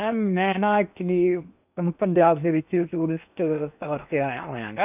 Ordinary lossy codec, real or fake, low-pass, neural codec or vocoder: none; fake; 3.6 kHz; codec, 16 kHz in and 24 kHz out, 0.8 kbps, FocalCodec, streaming, 65536 codes